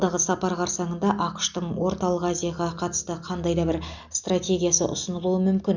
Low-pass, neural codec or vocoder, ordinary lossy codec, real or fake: 7.2 kHz; none; none; real